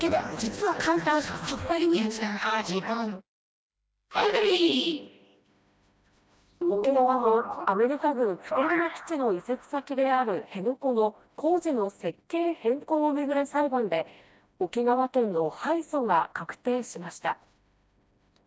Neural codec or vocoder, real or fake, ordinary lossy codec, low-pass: codec, 16 kHz, 1 kbps, FreqCodec, smaller model; fake; none; none